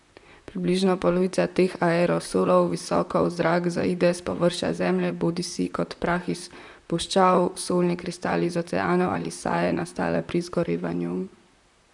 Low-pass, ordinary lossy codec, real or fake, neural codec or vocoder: 10.8 kHz; none; fake; vocoder, 44.1 kHz, 128 mel bands, Pupu-Vocoder